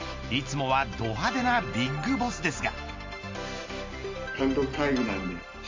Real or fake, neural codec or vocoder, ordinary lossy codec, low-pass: real; none; MP3, 64 kbps; 7.2 kHz